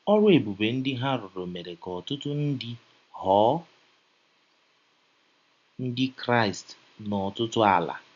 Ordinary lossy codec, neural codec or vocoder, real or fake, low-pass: none; none; real; 7.2 kHz